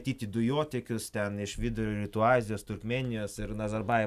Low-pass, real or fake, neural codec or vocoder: 14.4 kHz; real; none